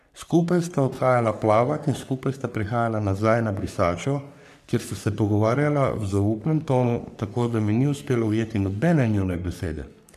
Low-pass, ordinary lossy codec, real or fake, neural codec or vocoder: 14.4 kHz; none; fake; codec, 44.1 kHz, 3.4 kbps, Pupu-Codec